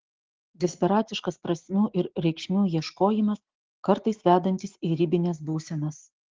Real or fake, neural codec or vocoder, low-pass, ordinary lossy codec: real; none; 7.2 kHz; Opus, 16 kbps